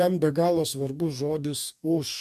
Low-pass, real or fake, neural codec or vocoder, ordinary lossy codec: 14.4 kHz; fake; codec, 44.1 kHz, 2.6 kbps, DAC; MP3, 96 kbps